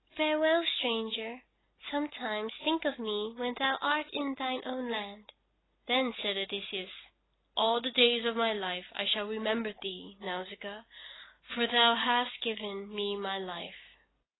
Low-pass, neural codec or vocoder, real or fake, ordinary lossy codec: 7.2 kHz; none; real; AAC, 16 kbps